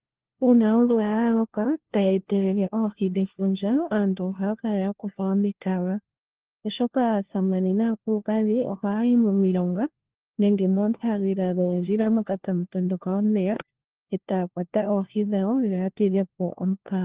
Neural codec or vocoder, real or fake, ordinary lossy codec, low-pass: codec, 16 kHz, 1 kbps, FunCodec, trained on LibriTTS, 50 frames a second; fake; Opus, 16 kbps; 3.6 kHz